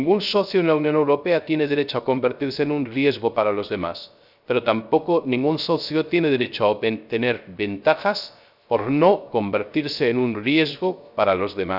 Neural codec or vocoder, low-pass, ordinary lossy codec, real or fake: codec, 16 kHz, 0.3 kbps, FocalCodec; 5.4 kHz; none; fake